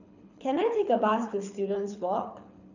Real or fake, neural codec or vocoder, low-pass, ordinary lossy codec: fake; codec, 24 kHz, 6 kbps, HILCodec; 7.2 kHz; none